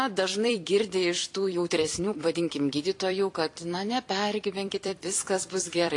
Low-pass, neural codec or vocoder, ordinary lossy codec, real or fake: 10.8 kHz; vocoder, 44.1 kHz, 128 mel bands every 256 samples, BigVGAN v2; AAC, 48 kbps; fake